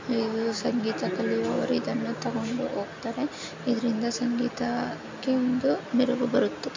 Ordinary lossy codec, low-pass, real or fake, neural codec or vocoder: MP3, 64 kbps; 7.2 kHz; real; none